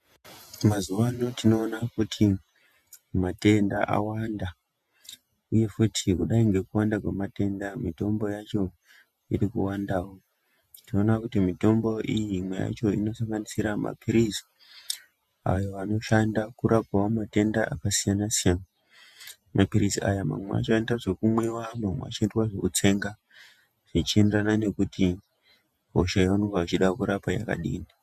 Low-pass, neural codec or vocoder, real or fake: 14.4 kHz; vocoder, 48 kHz, 128 mel bands, Vocos; fake